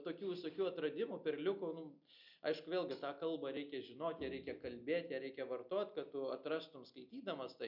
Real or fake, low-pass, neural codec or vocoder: real; 5.4 kHz; none